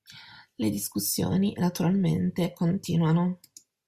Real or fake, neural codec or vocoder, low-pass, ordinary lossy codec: real; none; 14.4 kHz; Opus, 64 kbps